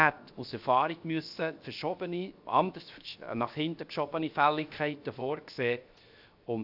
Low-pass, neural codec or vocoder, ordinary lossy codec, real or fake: 5.4 kHz; codec, 16 kHz, 0.7 kbps, FocalCodec; AAC, 48 kbps; fake